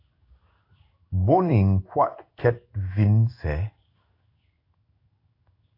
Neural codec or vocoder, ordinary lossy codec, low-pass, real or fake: codec, 16 kHz in and 24 kHz out, 1 kbps, XY-Tokenizer; AAC, 32 kbps; 5.4 kHz; fake